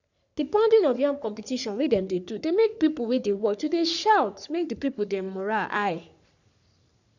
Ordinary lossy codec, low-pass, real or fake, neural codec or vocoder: none; 7.2 kHz; fake; codec, 44.1 kHz, 3.4 kbps, Pupu-Codec